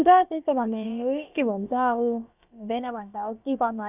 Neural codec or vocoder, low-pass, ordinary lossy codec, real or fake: codec, 16 kHz, about 1 kbps, DyCAST, with the encoder's durations; 3.6 kHz; none; fake